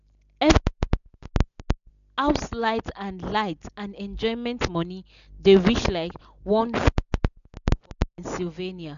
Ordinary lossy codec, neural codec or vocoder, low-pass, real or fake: none; none; 7.2 kHz; real